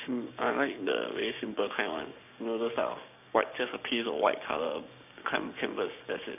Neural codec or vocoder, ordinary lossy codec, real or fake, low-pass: codec, 16 kHz, 6 kbps, DAC; none; fake; 3.6 kHz